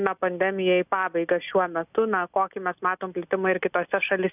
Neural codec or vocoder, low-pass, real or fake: none; 3.6 kHz; real